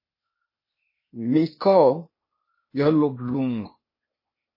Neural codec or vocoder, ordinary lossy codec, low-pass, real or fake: codec, 16 kHz, 0.8 kbps, ZipCodec; MP3, 24 kbps; 5.4 kHz; fake